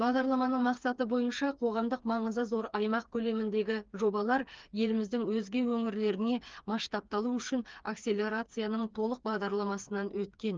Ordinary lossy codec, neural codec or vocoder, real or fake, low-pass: Opus, 24 kbps; codec, 16 kHz, 4 kbps, FreqCodec, smaller model; fake; 7.2 kHz